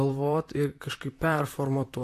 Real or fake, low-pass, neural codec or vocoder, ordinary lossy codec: fake; 14.4 kHz; vocoder, 44.1 kHz, 128 mel bands, Pupu-Vocoder; AAC, 64 kbps